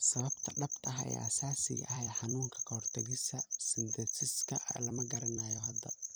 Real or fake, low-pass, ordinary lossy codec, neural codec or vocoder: fake; none; none; vocoder, 44.1 kHz, 128 mel bands every 256 samples, BigVGAN v2